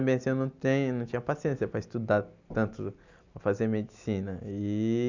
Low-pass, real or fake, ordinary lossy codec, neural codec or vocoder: 7.2 kHz; real; none; none